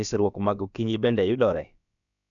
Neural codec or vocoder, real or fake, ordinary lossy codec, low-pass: codec, 16 kHz, about 1 kbps, DyCAST, with the encoder's durations; fake; none; 7.2 kHz